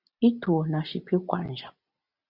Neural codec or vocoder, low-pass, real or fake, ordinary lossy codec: none; 5.4 kHz; real; Opus, 64 kbps